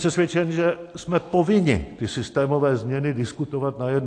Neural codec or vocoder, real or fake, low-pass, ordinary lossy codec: none; real; 9.9 kHz; AAC, 48 kbps